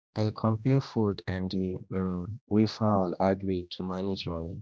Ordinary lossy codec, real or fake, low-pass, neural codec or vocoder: none; fake; none; codec, 16 kHz, 1 kbps, X-Codec, HuBERT features, trained on general audio